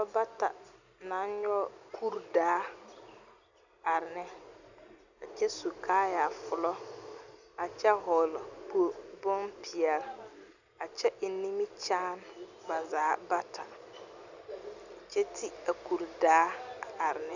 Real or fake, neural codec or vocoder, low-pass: real; none; 7.2 kHz